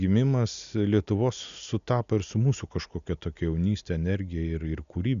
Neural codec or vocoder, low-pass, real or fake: none; 7.2 kHz; real